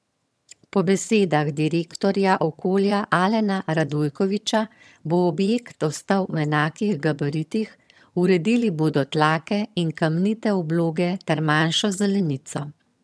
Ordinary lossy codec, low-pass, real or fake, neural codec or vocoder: none; none; fake; vocoder, 22.05 kHz, 80 mel bands, HiFi-GAN